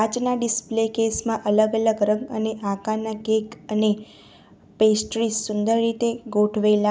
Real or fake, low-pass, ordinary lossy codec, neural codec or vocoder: real; none; none; none